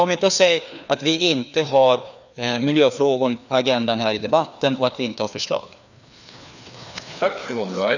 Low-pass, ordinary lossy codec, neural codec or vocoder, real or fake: 7.2 kHz; none; codec, 16 kHz, 2 kbps, FreqCodec, larger model; fake